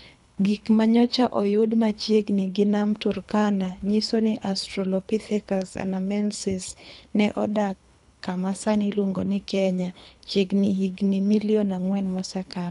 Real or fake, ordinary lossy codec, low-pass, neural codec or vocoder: fake; none; 10.8 kHz; codec, 24 kHz, 3 kbps, HILCodec